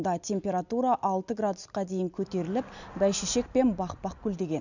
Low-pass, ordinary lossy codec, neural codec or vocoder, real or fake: 7.2 kHz; none; none; real